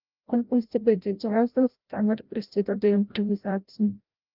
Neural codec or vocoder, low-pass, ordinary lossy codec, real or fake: codec, 16 kHz, 0.5 kbps, FreqCodec, larger model; 5.4 kHz; Opus, 24 kbps; fake